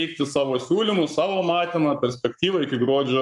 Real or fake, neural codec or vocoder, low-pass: fake; codec, 44.1 kHz, 7.8 kbps, Pupu-Codec; 10.8 kHz